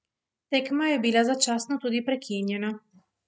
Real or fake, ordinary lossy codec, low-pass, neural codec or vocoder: real; none; none; none